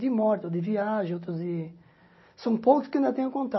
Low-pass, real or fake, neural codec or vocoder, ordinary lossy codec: 7.2 kHz; real; none; MP3, 24 kbps